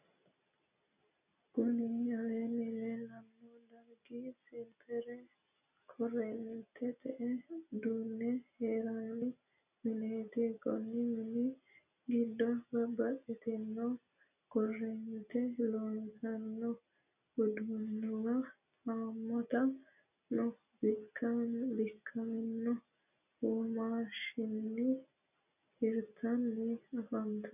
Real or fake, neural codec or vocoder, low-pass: real; none; 3.6 kHz